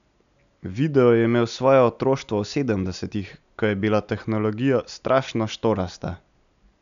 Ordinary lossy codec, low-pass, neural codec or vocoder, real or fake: none; 7.2 kHz; none; real